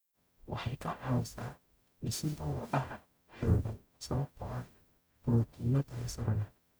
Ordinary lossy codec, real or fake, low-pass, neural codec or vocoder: none; fake; none; codec, 44.1 kHz, 0.9 kbps, DAC